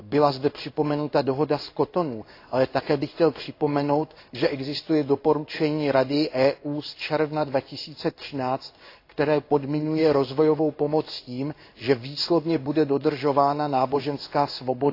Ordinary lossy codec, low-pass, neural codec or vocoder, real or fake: AAC, 32 kbps; 5.4 kHz; codec, 16 kHz in and 24 kHz out, 1 kbps, XY-Tokenizer; fake